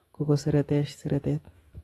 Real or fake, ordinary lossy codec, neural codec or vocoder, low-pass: fake; AAC, 32 kbps; autoencoder, 48 kHz, 128 numbers a frame, DAC-VAE, trained on Japanese speech; 19.8 kHz